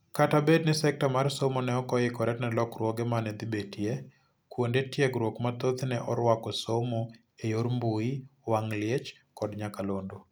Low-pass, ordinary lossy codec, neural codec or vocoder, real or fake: none; none; none; real